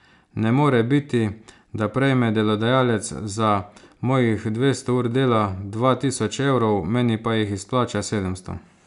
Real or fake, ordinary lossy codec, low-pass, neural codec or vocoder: real; none; 10.8 kHz; none